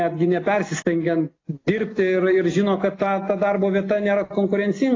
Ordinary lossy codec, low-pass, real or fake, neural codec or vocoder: AAC, 32 kbps; 7.2 kHz; real; none